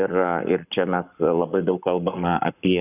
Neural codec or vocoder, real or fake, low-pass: codec, 44.1 kHz, 7.8 kbps, Pupu-Codec; fake; 3.6 kHz